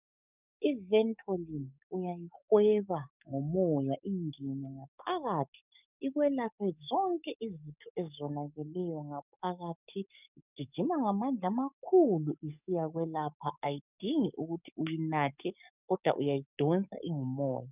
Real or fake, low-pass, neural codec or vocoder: fake; 3.6 kHz; codec, 44.1 kHz, 7.8 kbps, DAC